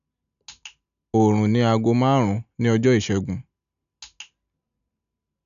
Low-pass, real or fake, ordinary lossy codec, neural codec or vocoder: 7.2 kHz; real; none; none